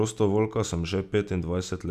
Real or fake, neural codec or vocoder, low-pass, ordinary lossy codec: real; none; 14.4 kHz; none